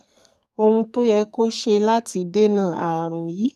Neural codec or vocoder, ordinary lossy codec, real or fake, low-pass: codec, 44.1 kHz, 2.6 kbps, SNAC; none; fake; 14.4 kHz